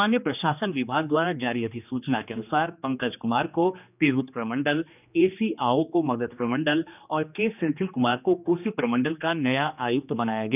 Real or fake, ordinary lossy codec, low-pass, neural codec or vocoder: fake; none; 3.6 kHz; codec, 16 kHz, 2 kbps, X-Codec, HuBERT features, trained on general audio